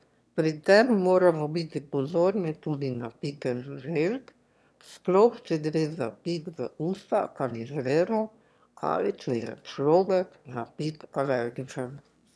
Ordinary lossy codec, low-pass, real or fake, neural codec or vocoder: none; none; fake; autoencoder, 22.05 kHz, a latent of 192 numbers a frame, VITS, trained on one speaker